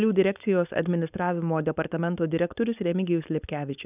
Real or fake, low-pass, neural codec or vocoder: fake; 3.6 kHz; codec, 16 kHz, 4.8 kbps, FACodec